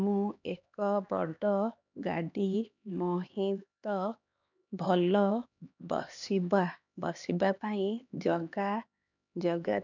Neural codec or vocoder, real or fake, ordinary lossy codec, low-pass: codec, 16 kHz, 2 kbps, X-Codec, HuBERT features, trained on LibriSpeech; fake; none; 7.2 kHz